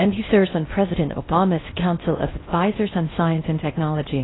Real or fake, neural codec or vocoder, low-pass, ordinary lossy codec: fake; codec, 16 kHz in and 24 kHz out, 0.6 kbps, FocalCodec, streaming, 4096 codes; 7.2 kHz; AAC, 16 kbps